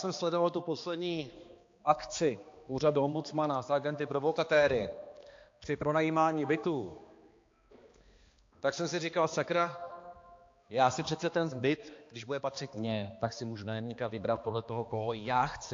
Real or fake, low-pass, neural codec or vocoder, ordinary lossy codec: fake; 7.2 kHz; codec, 16 kHz, 2 kbps, X-Codec, HuBERT features, trained on balanced general audio; AAC, 48 kbps